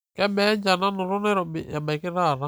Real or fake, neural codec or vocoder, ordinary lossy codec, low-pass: real; none; none; none